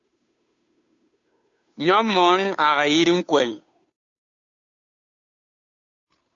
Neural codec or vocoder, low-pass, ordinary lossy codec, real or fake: codec, 16 kHz, 2 kbps, FunCodec, trained on Chinese and English, 25 frames a second; 7.2 kHz; AAC, 64 kbps; fake